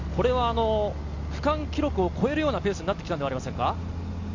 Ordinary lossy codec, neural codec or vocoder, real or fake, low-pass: Opus, 64 kbps; none; real; 7.2 kHz